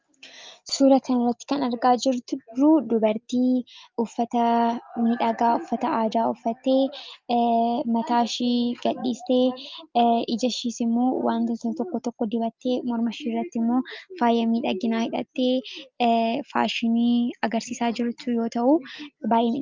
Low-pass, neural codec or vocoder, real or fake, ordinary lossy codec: 7.2 kHz; none; real; Opus, 24 kbps